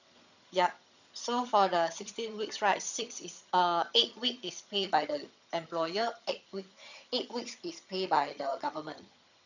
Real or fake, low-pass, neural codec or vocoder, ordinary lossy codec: fake; 7.2 kHz; vocoder, 22.05 kHz, 80 mel bands, HiFi-GAN; none